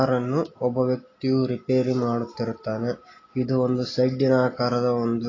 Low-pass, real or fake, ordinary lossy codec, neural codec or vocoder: 7.2 kHz; real; AAC, 32 kbps; none